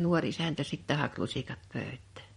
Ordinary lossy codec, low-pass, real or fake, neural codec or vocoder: MP3, 48 kbps; 19.8 kHz; fake; vocoder, 44.1 kHz, 128 mel bands every 512 samples, BigVGAN v2